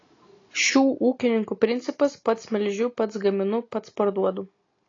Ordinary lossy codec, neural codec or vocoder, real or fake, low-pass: AAC, 32 kbps; none; real; 7.2 kHz